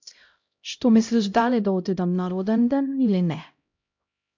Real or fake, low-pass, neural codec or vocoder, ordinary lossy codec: fake; 7.2 kHz; codec, 16 kHz, 0.5 kbps, X-Codec, HuBERT features, trained on LibriSpeech; MP3, 64 kbps